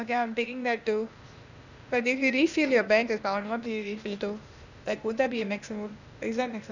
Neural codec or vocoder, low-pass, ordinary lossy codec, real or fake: codec, 16 kHz, 0.8 kbps, ZipCodec; 7.2 kHz; none; fake